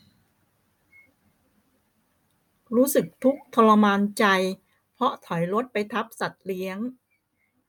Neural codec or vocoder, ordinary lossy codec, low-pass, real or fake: none; MP3, 96 kbps; 19.8 kHz; real